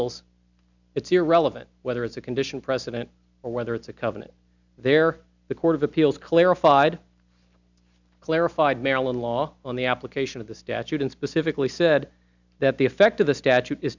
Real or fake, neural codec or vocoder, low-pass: real; none; 7.2 kHz